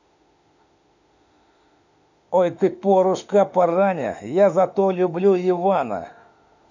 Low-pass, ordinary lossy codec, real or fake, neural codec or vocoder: 7.2 kHz; none; fake; autoencoder, 48 kHz, 32 numbers a frame, DAC-VAE, trained on Japanese speech